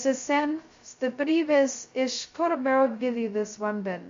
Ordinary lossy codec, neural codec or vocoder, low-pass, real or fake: MP3, 48 kbps; codec, 16 kHz, 0.2 kbps, FocalCodec; 7.2 kHz; fake